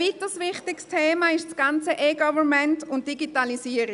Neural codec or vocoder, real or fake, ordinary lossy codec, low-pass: none; real; none; 10.8 kHz